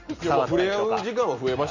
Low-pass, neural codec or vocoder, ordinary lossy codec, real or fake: 7.2 kHz; none; Opus, 64 kbps; real